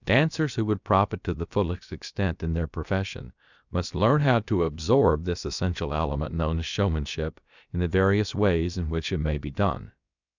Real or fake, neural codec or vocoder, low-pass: fake; codec, 16 kHz, about 1 kbps, DyCAST, with the encoder's durations; 7.2 kHz